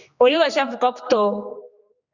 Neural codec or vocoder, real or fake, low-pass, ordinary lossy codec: codec, 16 kHz, 2 kbps, X-Codec, HuBERT features, trained on general audio; fake; 7.2 kHz; Opus, 64 kbps